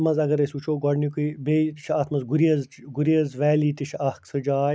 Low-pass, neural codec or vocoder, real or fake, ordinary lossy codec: none; none; real; none